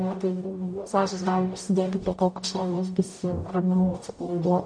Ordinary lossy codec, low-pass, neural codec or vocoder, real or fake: MP3, 48 kbps; 9.9 kHz; codec, 44.1 kHz, 0.9 kbps, DAC; fake